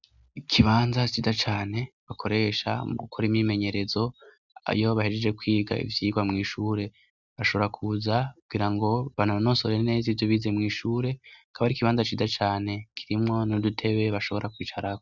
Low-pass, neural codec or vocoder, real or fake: 7.2 kHz; none; real